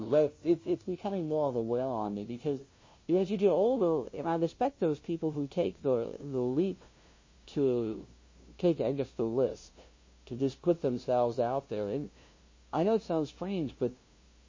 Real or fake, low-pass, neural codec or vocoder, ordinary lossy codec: fake; 7.2 kHz; codec, 16 kHz, 0.5 kbps, FunCodec, trained on LibriTTS, 25 frames a second; MP3, 32 kbps